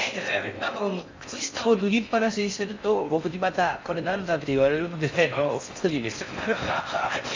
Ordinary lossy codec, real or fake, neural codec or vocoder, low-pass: MP3, 64 kbps; fake; codec, 16 kHz in and 24 kHz out, 0.6 kbps, FocalCodec, streaming, 4096 codes; 7.2 kHz